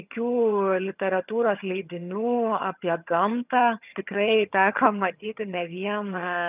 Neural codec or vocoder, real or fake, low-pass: vocoder, 22.05 kHz, 80 mel bands, HiFi-GAN; fake; 3.6 kHz